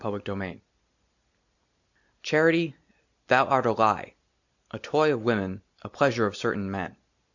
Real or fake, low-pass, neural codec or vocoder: real; 7.2 kHz; none